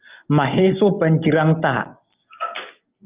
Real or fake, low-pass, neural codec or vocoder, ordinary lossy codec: real; 3.6 kHz; none; Opus, 24 kbps